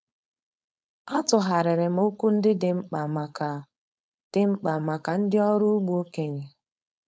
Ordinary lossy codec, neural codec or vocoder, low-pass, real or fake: none; codec, 16 kHz, 4.8 kbps, FACodec; none; fake